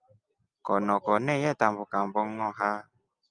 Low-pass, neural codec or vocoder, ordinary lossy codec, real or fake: 9.9 kHz; none; Opus, 32 kbps; real